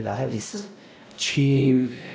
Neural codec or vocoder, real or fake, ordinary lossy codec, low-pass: codec, 16 kHz, 0.5 kbps, X-Codec, WavLM features, trained on Multilingual LibriSpeech; fake; none; none